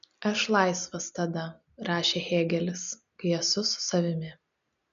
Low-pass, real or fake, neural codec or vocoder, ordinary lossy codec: 7.2 kHz; real; none; MP3, 96 kbps